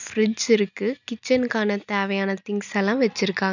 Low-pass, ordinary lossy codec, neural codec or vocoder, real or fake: 7.2 kHz; none; none; real